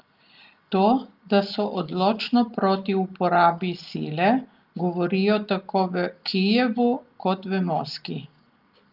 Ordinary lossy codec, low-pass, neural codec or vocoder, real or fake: Opus, 32 kbps; 5.4 kHz; none; real